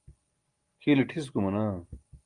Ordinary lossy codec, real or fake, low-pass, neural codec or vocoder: Opus, 24 kbps; real; 9.9 kHz; none